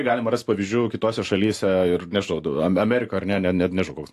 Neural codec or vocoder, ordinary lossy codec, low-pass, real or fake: none; AAC, 64 kbps; 14.4 kHz; real